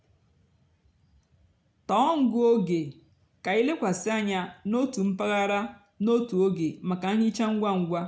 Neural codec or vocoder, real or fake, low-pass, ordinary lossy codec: none; real; none; none